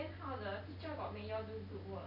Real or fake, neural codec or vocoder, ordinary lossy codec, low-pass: real; none; none; 5.4 kHz